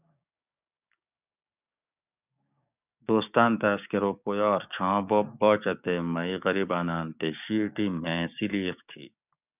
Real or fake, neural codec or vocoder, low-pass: fake; codec, 16 kHz, 6 kbps, DAC; 3.6 kHz